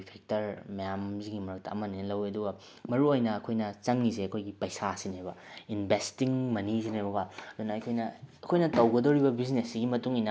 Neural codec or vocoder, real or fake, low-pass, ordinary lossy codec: none; real; none; none